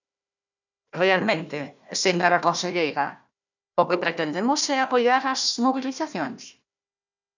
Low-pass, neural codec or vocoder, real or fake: 7.2 kHz; codec, 16 kHz, 1 kbps, FunCodec, trained on Chinese and English, 50 frames a second; fake